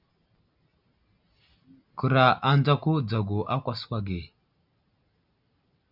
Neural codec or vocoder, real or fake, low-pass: none; real; 5.4 kHz